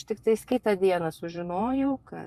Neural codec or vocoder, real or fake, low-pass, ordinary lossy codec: vocoder, 44.1 kHz, 128 mel bands every 512 samples, BigVGAN v2; fake; 14.4 kHz; Opus, 64 kbps